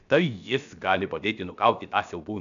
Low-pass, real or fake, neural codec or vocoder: 7.2 kHz; fake; codec, 16 kHz, about 1 kbps, DyCAST, with the encoder's durations